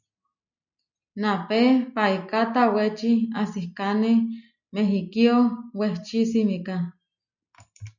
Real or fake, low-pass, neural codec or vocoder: real; 7.2 kHz; none